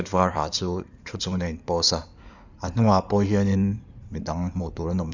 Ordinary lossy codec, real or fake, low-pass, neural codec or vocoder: none; fake; 7.2 kHz; codec, 16 kHz in and 24 kHz out, 2.2 kbps, FireRedTTS-2 codec